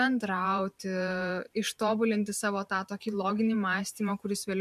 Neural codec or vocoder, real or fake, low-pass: vocoder, 44.1 kHz, 128 mel bands every 512 samples, BigVGAN v2; fake; 14.4 kHz